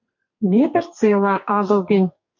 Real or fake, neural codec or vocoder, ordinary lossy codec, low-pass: fake; codec, 44.1 kHz, 2.6 kbps, SNAC; MP3, 48 kbps; 7.2 kHz